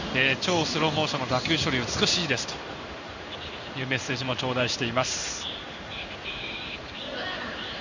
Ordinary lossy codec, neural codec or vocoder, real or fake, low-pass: none; codec, 16 kHz in and 24 kHz out, 1 kbps, XY-Tokenizer; fake; 7.2 kHz